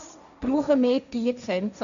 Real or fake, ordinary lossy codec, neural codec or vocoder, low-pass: fake; none; codec, 16 kHz, 1.1 kbps, Voila-Tokenizer; 7.2 kHz